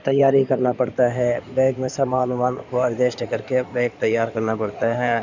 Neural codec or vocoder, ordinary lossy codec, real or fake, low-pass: codec, 16 kHz in and 24 kHz out, 2.2 kbps, FireRedTTS-2 codec; none; fake; 7.2 kHz